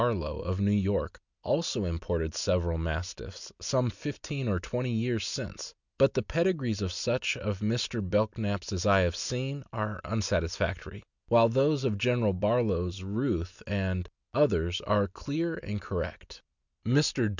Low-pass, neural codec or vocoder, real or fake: 7.2 kHz; none; real